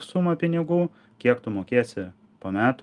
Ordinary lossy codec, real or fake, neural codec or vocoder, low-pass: Opus, 32 kbps; real; none; 10.8 kHz